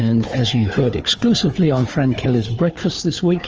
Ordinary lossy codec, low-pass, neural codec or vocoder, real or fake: Opus, 24 kbps; 7.2 kHz; codec, 16 kHz, 4 kbps, FunCodec, trained on Chinese and English, 50 frames a second; fake